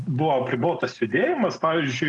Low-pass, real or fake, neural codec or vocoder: 10.8 kHz; real; none